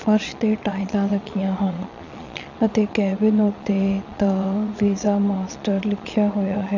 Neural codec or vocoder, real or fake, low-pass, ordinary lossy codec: vocoder, 22.05 kHz, 80 mel bands, Vocos; fake; 7.2 kHz; none